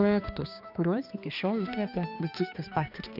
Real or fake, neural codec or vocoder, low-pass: fake; codec, 16 kHz, 2 kbps, X-Codec, HuBERT features, trained on balanced general audio; 5.4 kHz